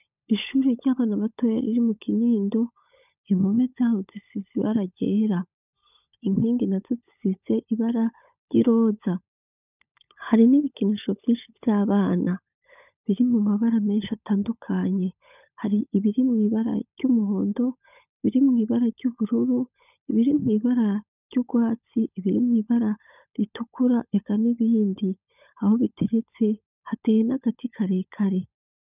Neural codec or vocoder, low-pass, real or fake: codec, 16 kHz, 16 kbps, FunCodec, trained on LibriTTS, 50 frames a second; 3.6 kHz; fake